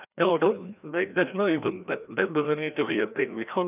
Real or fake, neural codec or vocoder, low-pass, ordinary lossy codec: fake; codec, 16 kHz, 1 kbps, FreqCodec, larger model; 3.6 kHz; none